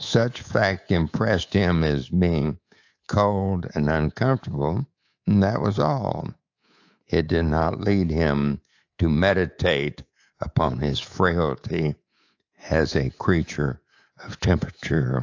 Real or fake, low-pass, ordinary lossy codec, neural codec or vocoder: fake; 7.2 kHz; AAC, 48 kbps; codec, 24 kHz, 3.1 kbps, DualCodec